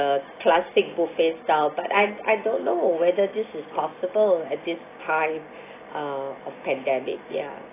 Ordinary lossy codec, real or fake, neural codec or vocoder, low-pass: AAC, 16 kbps; real; none; 3.6 kHz